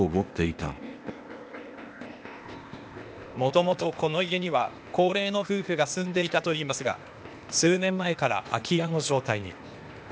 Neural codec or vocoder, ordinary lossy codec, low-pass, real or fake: codec, 16 kHz, 0.8 kbps, ZipCodec; none; none; fake